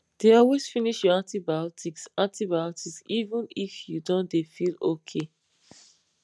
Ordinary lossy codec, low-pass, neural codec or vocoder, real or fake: none; none; none; real